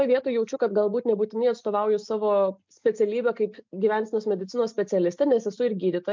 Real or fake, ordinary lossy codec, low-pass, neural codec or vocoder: real; MP3, 64 kbps; 7.2 kHz; none